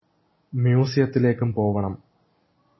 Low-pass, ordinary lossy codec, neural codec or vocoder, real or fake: 7.2 kHz; MP3, 24 kbps; none; real